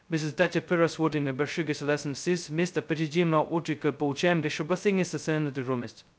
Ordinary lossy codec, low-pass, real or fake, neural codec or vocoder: none; none; fake; codec, 16 kHz, 0.2 kbps, FocalCodec